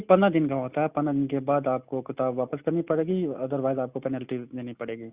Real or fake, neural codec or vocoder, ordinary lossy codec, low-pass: real; none; Opus, 64 kbps; 3.6 kHz